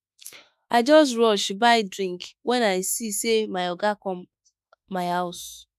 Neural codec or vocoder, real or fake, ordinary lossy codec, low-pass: autoencoder, 48 kHz, 32 numbers a frame, DAC-VAE, trained on Japanese speech; fake; none; 14.4 kHz